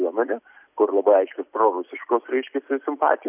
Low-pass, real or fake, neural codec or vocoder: 3.6 kHz; real; none